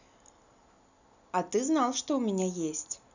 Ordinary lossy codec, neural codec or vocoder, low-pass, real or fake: none; none; 7.2 kHz; real